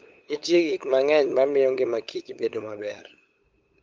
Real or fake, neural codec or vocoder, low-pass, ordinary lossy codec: fake; codec, 16 kHz, 8 kbps, FunCodec, trained on LibriTTS, 25 frames a second; 7.2 kHz; Opus, 24 kbps